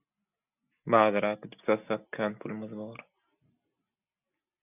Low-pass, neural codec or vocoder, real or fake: 3.6 kHz; none; real